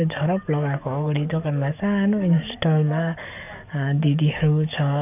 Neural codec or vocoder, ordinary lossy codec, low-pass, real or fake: codec, 44.1 kHz, 7.8 kbps, DAC; none; 3.6 kHz; fake